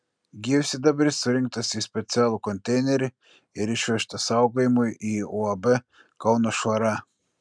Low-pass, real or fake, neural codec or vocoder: 9.9 kHz; real; none